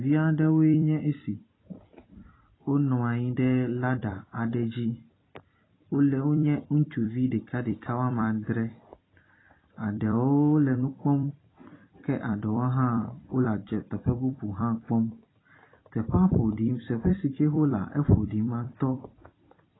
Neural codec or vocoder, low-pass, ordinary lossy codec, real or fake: vocoder, 44.1 kHz, 128 mel bands every 256 samples, BigVGAN v2; 7.2 kHz; AAC, 16 kbps; fake